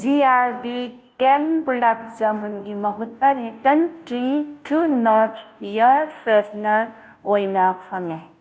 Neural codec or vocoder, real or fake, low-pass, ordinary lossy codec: codec, 16 kHz, 0.5 kbps, FunCodec, trained on Chinese and English, 25 frames a second; fake; none; none